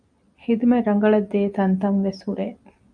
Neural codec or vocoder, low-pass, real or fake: none; 9.9 kHz; real